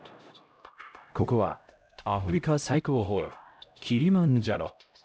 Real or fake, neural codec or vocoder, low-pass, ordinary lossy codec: fake; codec, 16 kHz, 0.5 kbps, X-Codec, HuBERT features, trained on LibriSpeech; none; none